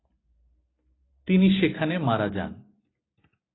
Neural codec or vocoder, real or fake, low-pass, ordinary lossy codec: none; real; 7.2 kHz; AAC, 16 kbps